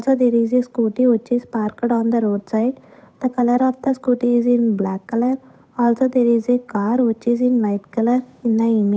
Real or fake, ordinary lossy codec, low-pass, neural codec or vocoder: fake; none; none; codec, 16 kHz, 8 kbps, FunCodec, trained on Chinese and English, 25 frames a second